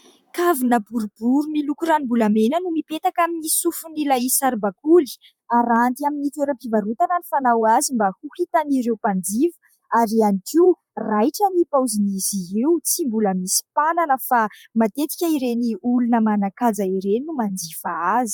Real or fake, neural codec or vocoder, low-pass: fake; vocoder, 44.1 kHz, 128 mel bands, Pupu-Vocoder; 19.8 kHz